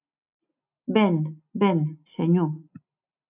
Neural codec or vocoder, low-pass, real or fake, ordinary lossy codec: none; 3.6 kHz; real; AAC, 32 kbps